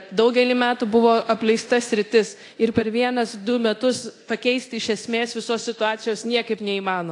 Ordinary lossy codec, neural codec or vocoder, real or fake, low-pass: AAC, 48 kbps; codec, 24 kHz, 0.9 kbps, DualCodec; fake; 10.8 kHz